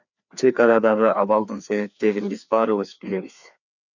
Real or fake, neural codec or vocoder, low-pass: fake; codec, 16 kHz, 2 kbps, FreqCodec, larger model; 7.2 kHz